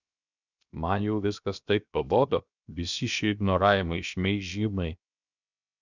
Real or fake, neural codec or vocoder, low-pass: fake; codec, 16 kHz, 0.7 kbps, FocalCodec; 7.2 kHz